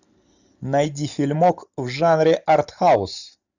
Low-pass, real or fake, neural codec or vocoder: 7.2 kHz; real; none